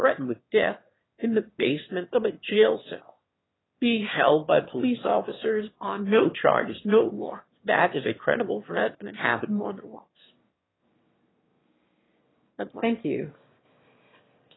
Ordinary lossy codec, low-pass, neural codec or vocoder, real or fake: AAC, 16 kbps; 7.2 kHz; autoencoder, 22.05 kHz, a latent of 192 numbers a frame, VITS, trained on one speaker; fake